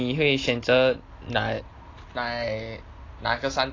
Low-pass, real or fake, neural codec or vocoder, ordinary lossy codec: 7.2 kHz; real; none; AAC, 32 kbps